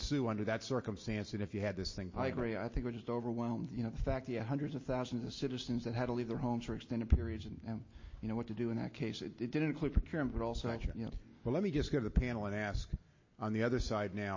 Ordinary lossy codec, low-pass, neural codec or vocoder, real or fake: MP3, 32 kbps; 7.2 kHz; none; real